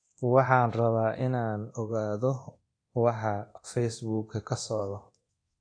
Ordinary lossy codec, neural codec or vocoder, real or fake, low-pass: AAC, 48 kbps; codec, 24 kHz, 0.9 kbps, DualCodec; fake; 9.9 kHz